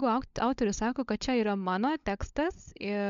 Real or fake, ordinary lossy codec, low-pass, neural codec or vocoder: fake; MP3, 64 kbps; 7.2 kHz; codec, 16 kHz, 16 kbps, FunCodec, trained on LibriTTS, 50 frames a second